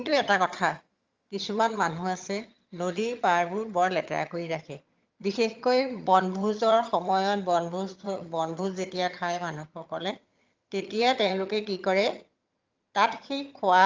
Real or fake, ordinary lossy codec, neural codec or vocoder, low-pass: fake; Opus, 32 kbps; vocoder, 22.05 kHz, 80 mel bands, HiFi-GAN; 7.2 kHz